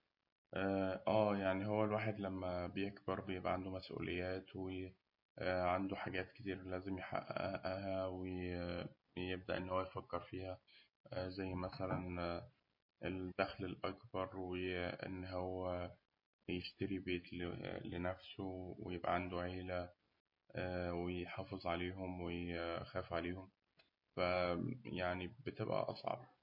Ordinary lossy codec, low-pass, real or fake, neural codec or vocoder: MP3, 32 kbps; 5.4 kHz; real; none